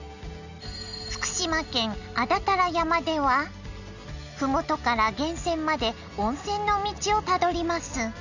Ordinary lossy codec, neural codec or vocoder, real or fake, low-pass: none; none; real; 7.2 kHz